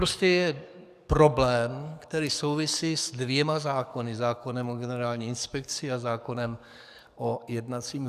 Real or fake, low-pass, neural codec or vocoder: fake; 14.4 kHz; codec, 44.1 kHz, 7.8 kbps, DAC